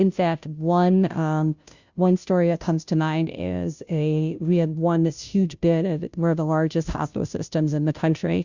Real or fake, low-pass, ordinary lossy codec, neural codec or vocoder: fake; 7.2 kHz; Opus, 64 kbps; codec, 16 kHz, 0.5 kbps, FunCodec, trained on Chinese and English, 25 frames a second